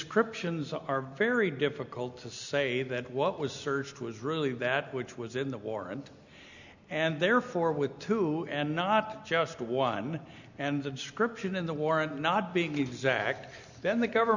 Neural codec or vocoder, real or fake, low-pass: none; real; 7.2 kHz